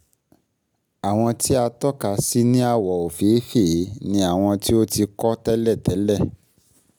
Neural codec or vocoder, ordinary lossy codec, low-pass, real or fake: none; none; none; real